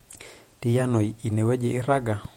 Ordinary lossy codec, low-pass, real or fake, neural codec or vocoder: MP3, 64 kbps; 19.8 kHz; fake; vocoder, 48 kHz, 128 mel bands, Vocos